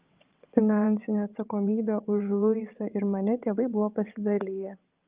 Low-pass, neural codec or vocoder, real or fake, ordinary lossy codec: 3.6 kHz; vocoder, 22.05 kHz, 80 mel bands, WaveNeXt; fake; Opus, 32 kbps